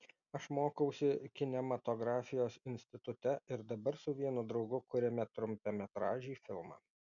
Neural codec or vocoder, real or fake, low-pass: none; real; 7.2 kHz